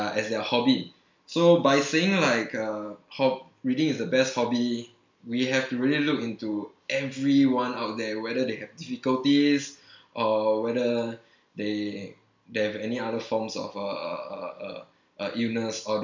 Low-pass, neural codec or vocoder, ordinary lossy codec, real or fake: 7.2 kHz; none; MP3, 64 kbps; real